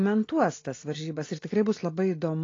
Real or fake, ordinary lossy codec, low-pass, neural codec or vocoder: real; AAC, 32 kbps; 7.2 kHz; none